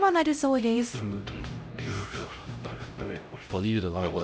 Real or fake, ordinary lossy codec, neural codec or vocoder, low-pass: fake; none; codec, 16 kHz, 0.5 kbps, X-Codec, HuBERT features, trained on LibriSpeech; none